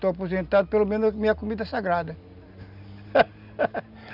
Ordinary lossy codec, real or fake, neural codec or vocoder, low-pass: none; real; none; 5.4 kHz